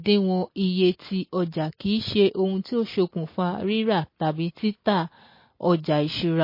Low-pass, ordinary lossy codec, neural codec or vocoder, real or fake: 5.4 kHz; MP3, 24 kbps; none; real